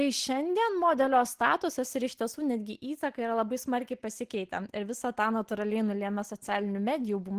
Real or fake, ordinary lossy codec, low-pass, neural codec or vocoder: real; Opus, 16 kbps; 14.4 kHz; none